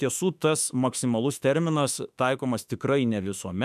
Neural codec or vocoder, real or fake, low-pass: autoencoder, 48 kHz, 32 numbers a frame, DAC-VAE, trained on Japanese speech; fake; 14.4 kHz